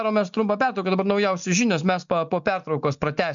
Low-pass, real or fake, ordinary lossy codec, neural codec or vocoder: 7.2 kHz; real; MP3, 64 kbps; none